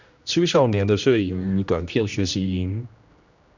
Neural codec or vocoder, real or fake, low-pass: codec, 16 kHz, 1 kbps, X-Codec, HuBERT features, trained on general audio; fake; 7.2 kHz